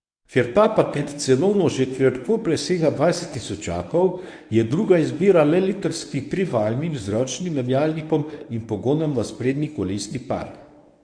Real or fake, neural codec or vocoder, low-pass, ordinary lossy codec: fake; codec, 24 kHz, 0.9 kbps, WavTokenizer, medium speech release version 1; 9.9 kHz; none